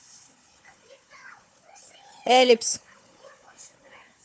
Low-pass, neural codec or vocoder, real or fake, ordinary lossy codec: none; codec, 16 kHz, 4 kbps, FunCodec, trained on Chinese and English, 50 frames a second; fake; none